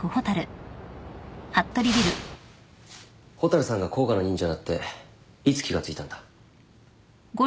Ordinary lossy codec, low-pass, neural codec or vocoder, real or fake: none; none; none; real